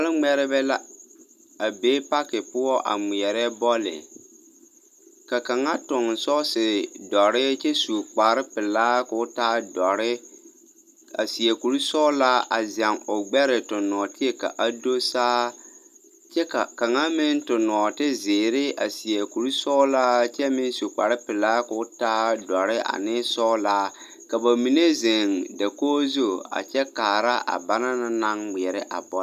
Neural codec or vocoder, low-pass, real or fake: none; 14.4 kHz; real